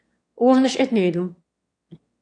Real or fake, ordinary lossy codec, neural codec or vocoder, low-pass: fake; AAC, 48 kbps; autoencoder, 22.05 kHz, a latent of 192 numbers a frame, VITS, trained on one speaker; 9.9 kHz